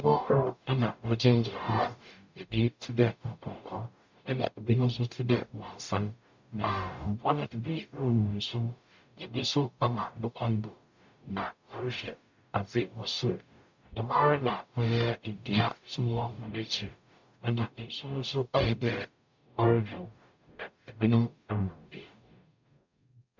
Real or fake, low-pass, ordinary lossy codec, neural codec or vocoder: fake; 7.2 kHz; MP3, 64 kbps; codec, 44.1 kHz, 0.9 kbps, DAC